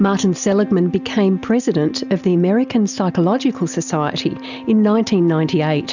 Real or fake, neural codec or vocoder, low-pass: real; none; 7.2 kHz